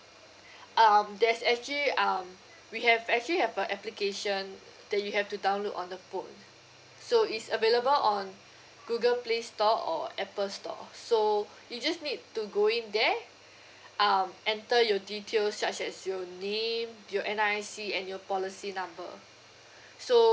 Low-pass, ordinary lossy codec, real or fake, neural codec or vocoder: none; none; real; none